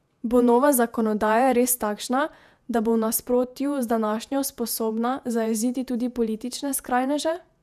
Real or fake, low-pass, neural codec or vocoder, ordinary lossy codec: fake; 14.4 kHz; vocoder, 48 kHz, 128 mel bands, Vocos; none